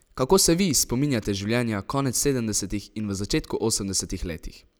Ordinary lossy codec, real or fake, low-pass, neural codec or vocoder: none; real; none; none